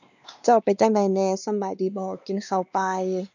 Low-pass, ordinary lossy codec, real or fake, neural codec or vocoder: 7.2 kHz; none; fake; codec, 16 kHz, 2 kbps, X-Codec, WavLM features, trained on Multilingual LibriSpeech